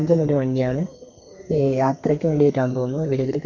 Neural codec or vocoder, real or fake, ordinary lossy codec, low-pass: codec, 32 kHz, 1.9 kbps, SNAC; fake; AAC, 48 kbps; 7.2 kHz